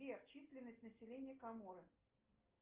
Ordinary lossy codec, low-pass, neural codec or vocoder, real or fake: Opus, 24 kbps; 3.6 kHz; none; real